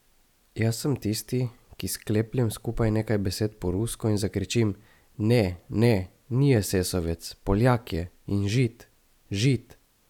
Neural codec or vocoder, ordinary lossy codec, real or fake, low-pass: none; none; real; 19.8 kHz